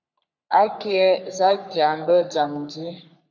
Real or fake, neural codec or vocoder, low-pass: fake; codec, 44.1 kHz, 3.4 kbps, Pupu-Codec; 7.2 kHz